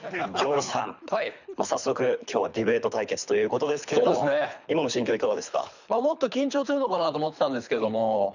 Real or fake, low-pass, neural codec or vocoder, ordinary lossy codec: fake; 7.2 kHz; codec, 24 kHz, 3 kbps, HILCodec; none